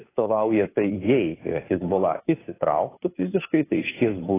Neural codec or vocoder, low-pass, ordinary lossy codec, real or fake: codec, 16 kHz, 2 kbps, FunCodec, trained on Chinese and English, 25 frames a second; 3.6 kHz; AAC, 16 kbps; fake